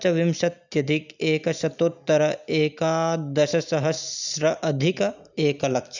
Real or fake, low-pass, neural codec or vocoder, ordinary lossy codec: real; 7.2 kHz; none; none